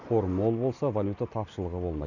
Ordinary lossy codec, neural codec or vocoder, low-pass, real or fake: none; none; 7.2 kHz; real